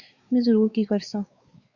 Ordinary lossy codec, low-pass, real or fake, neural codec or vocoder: Opus, 64 kbps; 7.2 kHz; fake; codec, 16 kHz, 4 kbps, X-Codec, WavLM features, trained on Multilingual LibriSpeech